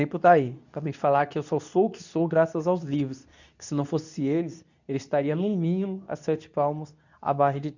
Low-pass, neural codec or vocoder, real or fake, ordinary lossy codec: 7.2 kHz; codec, 24 kHz, 0.9 kbps, WavTokenizer, medium speech release version 2; fake; none